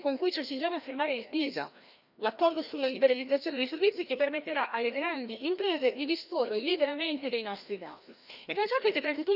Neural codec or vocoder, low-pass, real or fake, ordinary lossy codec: codec, 16 kHz, 1 kbps, FreqCodec, larger model; 5.4 kHz; fake; none